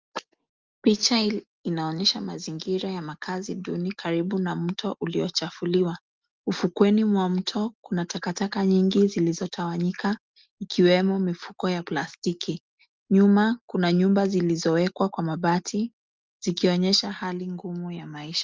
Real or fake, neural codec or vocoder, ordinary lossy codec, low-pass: real; none; Opus, 32 kbps; 7.2 kHz